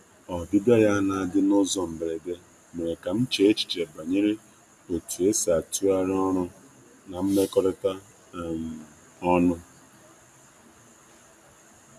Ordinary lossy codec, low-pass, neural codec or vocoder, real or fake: none; 14.4 kHz; none; real